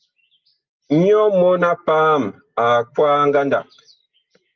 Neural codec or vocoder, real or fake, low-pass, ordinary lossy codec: none; real; 7.2 kHz; Opus, 32 kbps